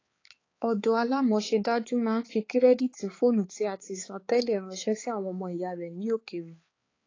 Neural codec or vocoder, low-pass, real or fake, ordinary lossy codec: codec, 16 kHz, 4 kbps, X-Codec, HuBERT features, trained on balanced general audio; 7.2 kHz; fake; AAC, 32 kbps